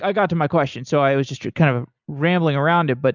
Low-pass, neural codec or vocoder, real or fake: 7.2 kHz; none; real